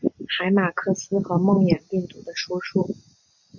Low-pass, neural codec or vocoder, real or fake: 7.2 kHz; none; real